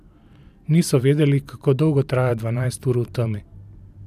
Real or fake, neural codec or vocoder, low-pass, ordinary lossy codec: fake; vocoder, 44.1 kHz, 128 mel bands every 512 samples, BigVGAN v2; 14.4 kHz; none